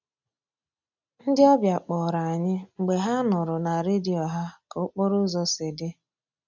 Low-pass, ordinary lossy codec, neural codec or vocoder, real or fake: 7.2 kHz; none; none; real